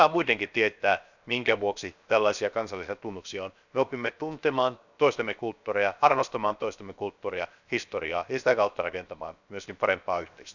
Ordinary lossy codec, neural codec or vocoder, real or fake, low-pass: none; codec, 16 kHz, 0.7 kbps, FocalCodec; fake; 7.2 kHz